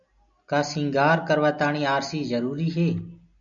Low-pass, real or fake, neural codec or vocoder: 7.2 kHz; real; none